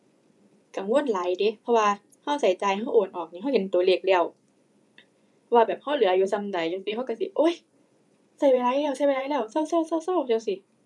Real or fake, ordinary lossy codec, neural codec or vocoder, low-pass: fake; none; vocoder, 24 kHz, 100 mel bands, Vocos; none